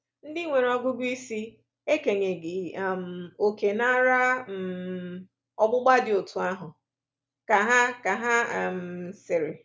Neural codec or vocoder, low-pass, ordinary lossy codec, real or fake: none; none; none; real